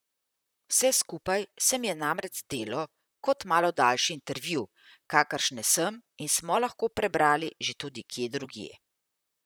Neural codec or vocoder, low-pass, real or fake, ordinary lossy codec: vocoder, 44.1 kHz, 128 mel bands, Pupu-Vocoder; none; fake; none